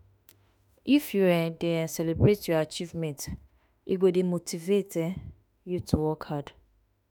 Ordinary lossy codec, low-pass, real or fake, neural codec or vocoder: none; none; fake; autoencoder, 48 kHz, 32 numbers a frame, DAC-VAE, trained on Japanese speech